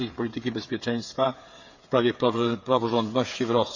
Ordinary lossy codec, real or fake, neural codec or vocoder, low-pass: none; fake; codec, 16 kHz, 8 kbps, FreqCodec, smaller model; 7.2 kHz